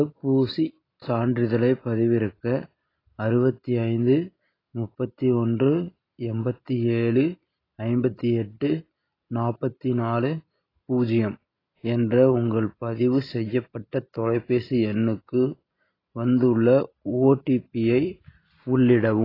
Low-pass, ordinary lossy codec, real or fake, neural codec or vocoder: 5.4 kHz; AAC, 24 kbps; real; none